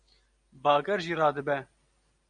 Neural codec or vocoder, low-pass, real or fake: none; 9.9 kHz; real